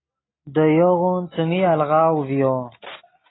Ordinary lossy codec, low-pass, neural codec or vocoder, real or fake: AAC, 16 kbps; 7.2 kHz; none; real